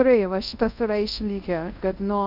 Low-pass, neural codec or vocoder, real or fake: 5.4 kHz; codec, 24 kHz, 0.5 kbps, DualCodec; fake